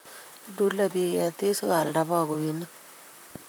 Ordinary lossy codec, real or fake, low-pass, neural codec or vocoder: none; fake; none; vocoder, 44.1 kHz, 128 mel bands, Pupu-Vocoder